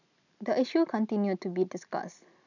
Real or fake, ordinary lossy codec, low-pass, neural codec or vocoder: real; none; 7.2 kHz; none